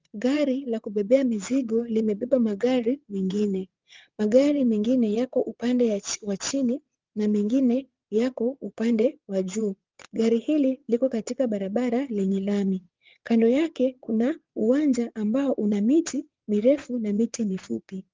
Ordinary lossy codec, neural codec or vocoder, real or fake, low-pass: Opus, 24 kbps; vocoder, 22.05 kHz, 80 mel bands, WaveNeXt; fake; 7.2 kHz